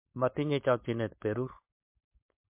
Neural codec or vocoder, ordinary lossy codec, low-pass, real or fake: codec, 16 kHz, 4.8 kbps, FACodec; MP3, 24 kbps; 3.6 kHz; fake